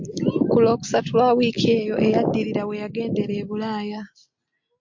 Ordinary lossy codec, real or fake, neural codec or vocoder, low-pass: MP3, 48 kbps; real; none; 7.2 kHz